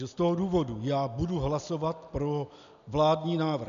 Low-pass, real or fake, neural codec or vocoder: 7.2 kHz; real; none